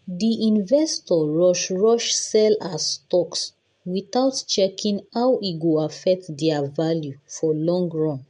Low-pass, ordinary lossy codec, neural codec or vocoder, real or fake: 9.9 kHz; MP3, 64 kbps; none; real